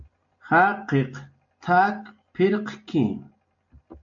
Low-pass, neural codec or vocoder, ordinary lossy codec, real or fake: 7.2 kHz; none; MP3, 48 kbps; real